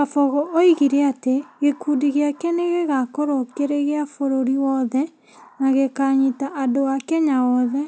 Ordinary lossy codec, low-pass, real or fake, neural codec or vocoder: none; none; real; none